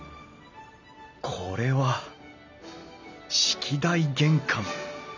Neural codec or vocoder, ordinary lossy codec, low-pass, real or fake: none; none; 7.2 kHz; real